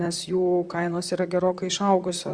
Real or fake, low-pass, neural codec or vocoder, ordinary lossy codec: fake; 9.9 kHz; vocoder, 44.1 kHz, 128 mel bands, Pupu-Vocoder; Opus, 64 kbps